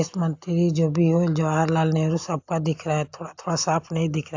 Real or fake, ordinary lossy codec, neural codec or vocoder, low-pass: real; AAC, 48 kbps; none; 7.2 kHz